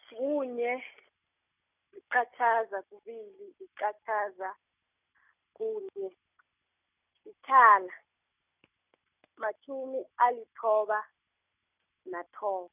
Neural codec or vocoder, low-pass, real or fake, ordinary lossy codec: none; 3.6 kHz; real; none